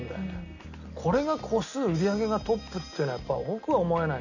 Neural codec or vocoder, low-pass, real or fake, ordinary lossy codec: none; 7.2 kHz; real; none